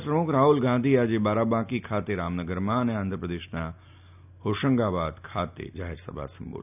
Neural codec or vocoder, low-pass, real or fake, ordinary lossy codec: none; 3.6 kHz; real; none